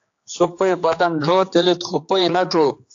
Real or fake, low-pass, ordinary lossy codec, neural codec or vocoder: fake; 7.2 kHz; AAC, 48 kbps; codec, 16 kHz, 4 kbps, X-Codec, HuBERT features, trained on general audio